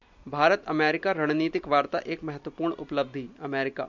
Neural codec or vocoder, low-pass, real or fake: none; 7.2 kHz; real